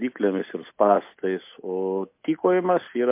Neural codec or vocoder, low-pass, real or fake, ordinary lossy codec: none; 3.6 kHz; real; MP3, 24 kbps